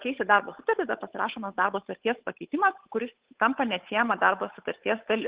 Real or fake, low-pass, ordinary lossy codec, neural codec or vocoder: fake; 3.6 kHz; Opus, 16 kbps; codec, 16 kHz, 8 kbps, FunCodec, trained on Chinese and English, 25 frames a second